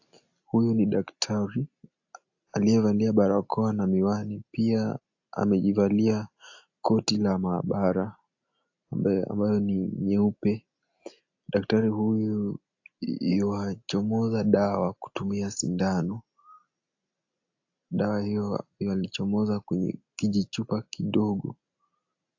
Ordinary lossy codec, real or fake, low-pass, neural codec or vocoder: AAC, 48 kbps; real; 7.2 kHz; none